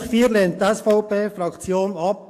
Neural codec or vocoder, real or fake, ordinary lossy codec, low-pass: codec, 44.1 kHz, 7.8 kbps, DAC; fake; AAC, 64 kbps; 14.4 kHz